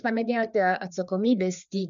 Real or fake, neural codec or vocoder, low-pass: fake; codec, 16 kHz, 4 kbps, FreqCodec, larger model; 7.2 kHz